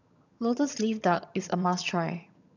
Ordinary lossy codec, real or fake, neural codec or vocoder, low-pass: none; fake; vocoder, 22.05 kHz, 80 mel bands, HiFi-GAN; 7.2 kHz